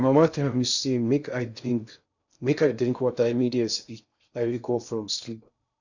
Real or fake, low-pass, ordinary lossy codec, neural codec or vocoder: fake; 7.2 kHz; none; codec, 16 kHz in and 24 kHz out, 0.6 kbps, FocalCodec, streaming, 2048 codes